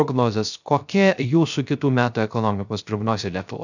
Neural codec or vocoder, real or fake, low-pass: codec, 16 kHz, 0.3 kbps, FocalCodec; fake; 7.2 kHz